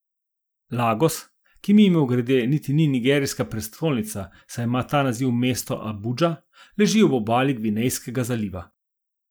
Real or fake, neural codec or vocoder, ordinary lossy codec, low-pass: real; none; none; none